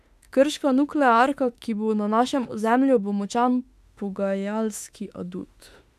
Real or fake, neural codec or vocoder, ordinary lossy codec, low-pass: fake; autoencoder, 48 kHz, 32 numbers a frame, DAC-VAE, trained on Japanese speech; none; 14.4 kHz